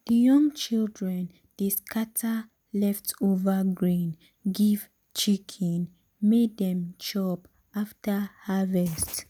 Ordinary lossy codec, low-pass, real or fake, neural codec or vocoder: none; none; real; none